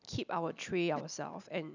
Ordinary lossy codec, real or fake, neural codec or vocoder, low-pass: none; real; none; 7.2 kHz